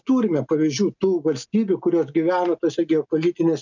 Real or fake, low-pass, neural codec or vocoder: real; 7.2 kHz; none